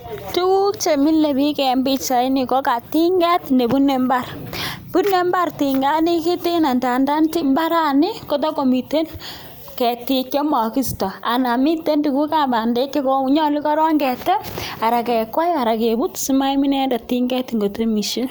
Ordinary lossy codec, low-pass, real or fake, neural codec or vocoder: none; none; real; none